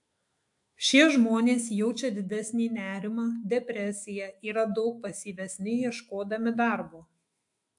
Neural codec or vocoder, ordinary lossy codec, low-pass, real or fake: autoencoder, 48 kHz, 128 numbers a frame, DAC-VAE, trained on Japanese speech; AAC, 64 kbps; 10.8 kHz; fake